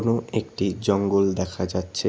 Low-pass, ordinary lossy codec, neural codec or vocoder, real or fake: none; none; none; real